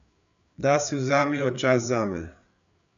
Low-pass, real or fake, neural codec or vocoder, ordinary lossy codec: 7.2 kHz; fake; codec, 16 kHz, 4 kbps, FreqCodec, larger model; MP3, 96 kbps